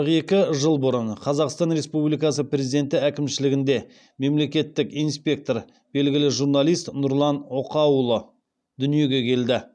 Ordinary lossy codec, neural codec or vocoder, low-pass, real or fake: none; none; 9.9 kHz; real